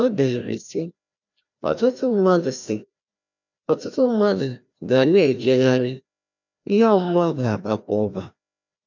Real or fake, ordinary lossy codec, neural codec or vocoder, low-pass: fake; none; codec, 16 kHz, 1 kbps, FreqCodec, larger model; 7.2 kHz